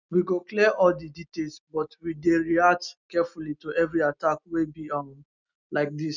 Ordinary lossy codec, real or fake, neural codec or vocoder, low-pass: none; real; none; 7.2 kHz